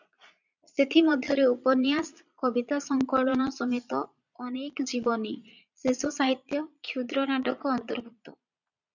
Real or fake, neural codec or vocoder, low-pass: fake; vocoder, 22.05 kHz, 80 mel bands, Vocos; 7.2 kHz